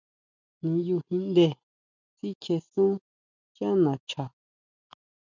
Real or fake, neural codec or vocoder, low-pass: real; none; 7.2 kHz